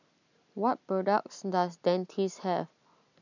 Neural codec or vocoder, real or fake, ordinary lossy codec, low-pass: none; real; none; 7.2 kHz